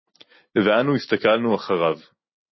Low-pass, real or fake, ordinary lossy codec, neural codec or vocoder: 7.2 kHz; real; MP3, 24 kbps; none